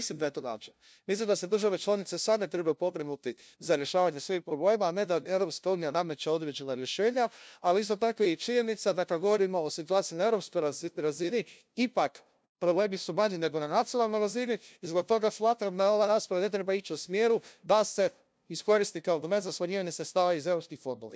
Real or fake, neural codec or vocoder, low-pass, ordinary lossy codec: fake; codec, 16 kHz, 0.5 kbps, FunCodec, trained on LibriTTS, 25 frames a second; none; none